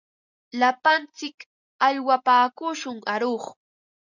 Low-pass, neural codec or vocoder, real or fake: 7.2 kHz; none; real